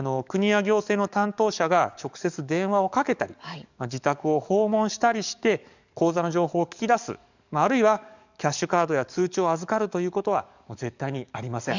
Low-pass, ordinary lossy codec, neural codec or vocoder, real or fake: 7.2 kHz; none; codec, 44.1 kHz, 7.8 kbps, DAC; fake